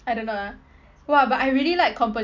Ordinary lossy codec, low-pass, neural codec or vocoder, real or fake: none; 7.2 kHz; none; real